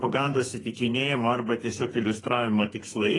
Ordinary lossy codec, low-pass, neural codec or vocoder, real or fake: AAC, 32 kbps; 10.8 kHz; codec, 32 kHz, 1.9 kbps, SNAC; fake